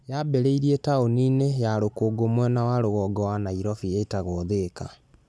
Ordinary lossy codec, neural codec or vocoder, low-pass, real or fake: none; none; none; real